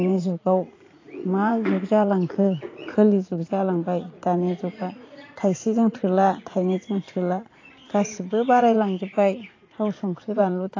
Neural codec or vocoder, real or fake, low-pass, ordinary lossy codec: vocoder, 44.1 kHz, 80 mel bands, Vocos; fake; 7.2 kHz; AAC, 32 kbps